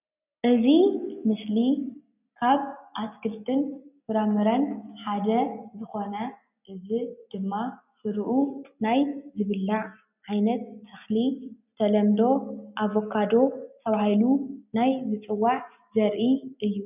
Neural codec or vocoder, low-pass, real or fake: none; 3.6 kHz; real